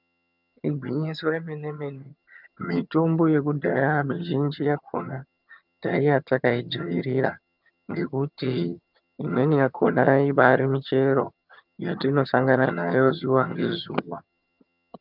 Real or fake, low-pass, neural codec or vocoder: fake; 5.4 kHz; vocoder, 22.05 kHz, 80 mel bands, HiFi-GAN